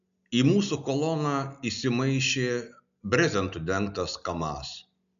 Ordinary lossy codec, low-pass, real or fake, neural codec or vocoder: MP3, 96 kbps; 7.2 kHz; real; none